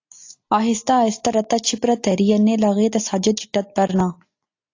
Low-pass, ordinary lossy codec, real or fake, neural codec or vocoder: 7.2 kHz; AAC, 48 kbps; real; none